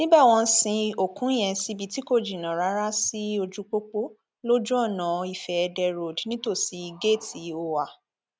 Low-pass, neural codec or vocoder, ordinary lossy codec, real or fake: none; none; none; real